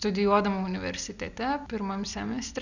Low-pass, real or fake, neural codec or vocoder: 7.2 kHz; real; none